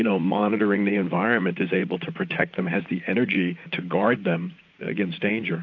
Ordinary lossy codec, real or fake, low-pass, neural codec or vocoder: AAC, 48 kbps; fake; 7.2 kHz; vocoder, 44.1 kHz, 80 mel bands, Vocos